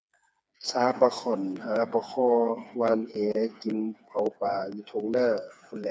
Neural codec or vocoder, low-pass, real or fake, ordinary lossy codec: codec, 16 kHz, 4 kbps, FreqCodec, smaller model; none; fake; none